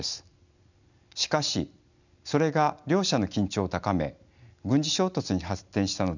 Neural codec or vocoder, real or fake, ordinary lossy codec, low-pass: none; real; none; 7.2 kHz